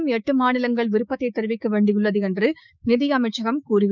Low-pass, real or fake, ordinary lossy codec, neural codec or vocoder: 7.2 kHz; fake; none; codec, 16 kHz, 6 kbps, DAC